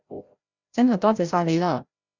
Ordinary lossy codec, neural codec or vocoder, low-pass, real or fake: Opus, 64 kbps; codec, 16 kHz, 0.5 kbps, FreqCodec, larger model; 7.2 kHz; fake